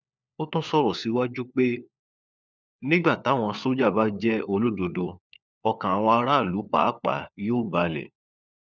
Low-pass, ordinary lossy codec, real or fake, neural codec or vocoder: none; none; fake; codec, 16 kHz, 4 kbps, FunCodec, trained on LibriTTS, 50 frames a second